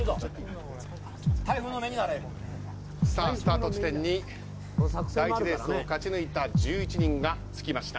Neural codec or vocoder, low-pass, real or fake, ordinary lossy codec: none; none; real; none